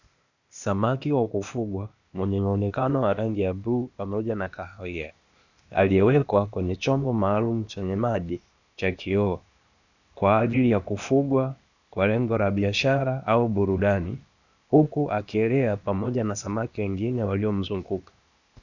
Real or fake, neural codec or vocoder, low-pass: fake; codec, 16 kHz, 0.8 kbps, ZipCodec; 7.2 kHz